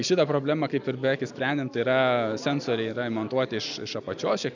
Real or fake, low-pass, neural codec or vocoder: real; 7.2 kHz; none